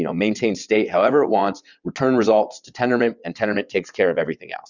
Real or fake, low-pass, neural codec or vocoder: fake; 7.2 kHz; vocoder, 22.05 kHz, 80 mel bands, WaveNeXt